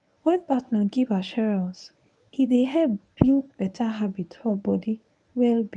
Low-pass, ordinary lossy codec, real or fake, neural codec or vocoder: 10.8 kHz; none; fake; codec, 24 kHz, 0.9 kbps, WavTokenizer, medium speech release version 1